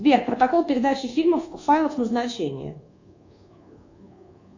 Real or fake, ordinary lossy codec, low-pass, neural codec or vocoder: fake; AAC, 48 kbps; 7.2 kHz; codec, 24 kHz, 1.2 kbps, DualCodec